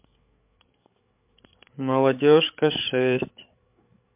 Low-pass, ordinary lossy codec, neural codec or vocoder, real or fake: 3.6 kHz; MP3, 32 kbps; codec, 16 kHz, 8 kbps, FreqCodec, larger model; fake